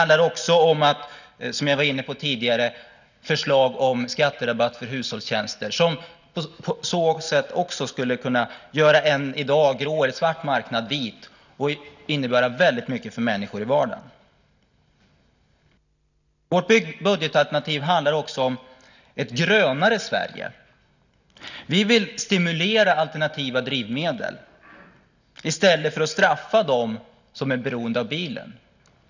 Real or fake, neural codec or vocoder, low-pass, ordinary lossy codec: real; none; 7.2 kHz; none